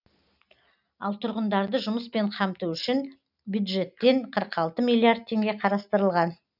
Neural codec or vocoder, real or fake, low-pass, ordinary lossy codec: none; real; 5.4 kHz; none